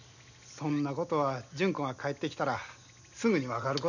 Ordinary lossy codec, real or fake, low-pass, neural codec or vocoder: none; real; 7.2 kHz; none